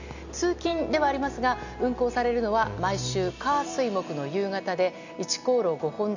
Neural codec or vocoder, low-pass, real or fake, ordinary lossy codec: none; 7.2 kHz; real; none